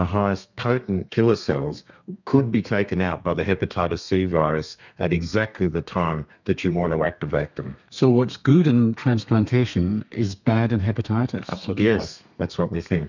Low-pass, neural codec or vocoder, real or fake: 7.2 kHz; codec, 32 kHz, 1.9 kbps, SNAC; fake